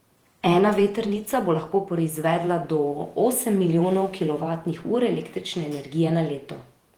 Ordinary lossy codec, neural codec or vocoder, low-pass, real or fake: Opus, 24 kbps; vocoder, 48 kHz, 128 mel bands, Vocos; 19.8 kHz; fake